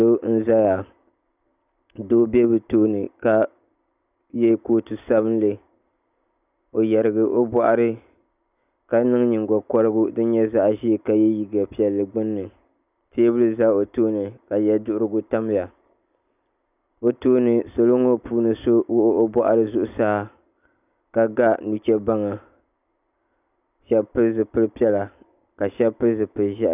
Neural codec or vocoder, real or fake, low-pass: none; real; 3.6 kHz